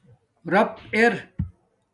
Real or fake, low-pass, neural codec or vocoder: real; 10.8 kHz; none